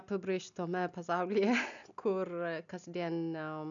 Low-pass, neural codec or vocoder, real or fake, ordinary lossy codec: 7.2 kHz; none; real; MP3, 96 kbps